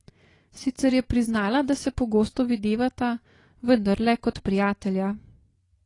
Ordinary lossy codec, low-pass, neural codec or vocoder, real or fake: AAC, 32 kbps; 10.8 kHz; none; real